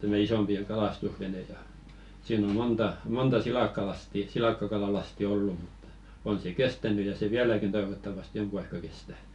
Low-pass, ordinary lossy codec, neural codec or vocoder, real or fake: 10.8 kHz; none; none; real